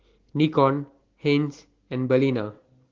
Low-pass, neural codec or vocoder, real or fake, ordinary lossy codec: 7.2 kHz; none; real; Opus, 16 kbps